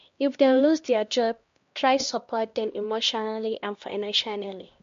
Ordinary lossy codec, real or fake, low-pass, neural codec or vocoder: MP3, 48 kbps; fake; 7.2 kHz; codec, 16 kHz, 1 kbps, X-Codec, HuBERT features, trained on LibriSpeech